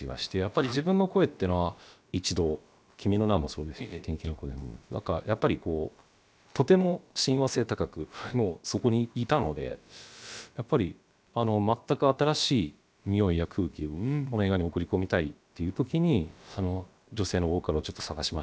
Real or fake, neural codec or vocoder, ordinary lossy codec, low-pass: fake; codec, 16 kHz, about 1 kbps, DyCAST, with the encoder's durations; none; none